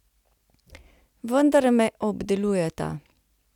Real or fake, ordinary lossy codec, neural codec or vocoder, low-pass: real; none; none; 19.8 kHz